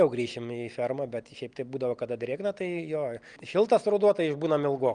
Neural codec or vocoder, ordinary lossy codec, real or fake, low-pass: none; Opus, 32 kbps; real; 9.9 kHz